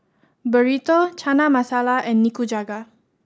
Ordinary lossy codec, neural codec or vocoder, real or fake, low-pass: none; none; real; none